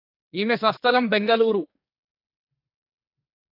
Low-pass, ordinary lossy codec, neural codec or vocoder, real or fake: 5.4 kHz; AAC, 32 kbps; codec, 44.1 kHz, 2.6 kbps, SNAC; fake